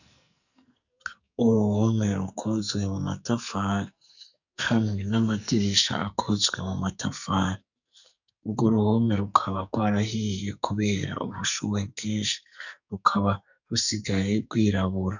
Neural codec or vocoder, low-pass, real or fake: codec, 44.1 kHz, 2.6 kbps, SNAC; 7.2 kHz; fake